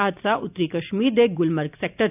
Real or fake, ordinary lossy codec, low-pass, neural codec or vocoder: real; none; 3.6 kHz; none